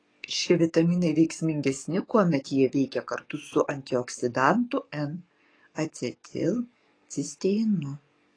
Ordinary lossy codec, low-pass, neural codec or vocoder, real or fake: AAC, 32 kbps; 9.9 kHz; codec, 44.1 kHz, 7.8 kbps, DAC; fake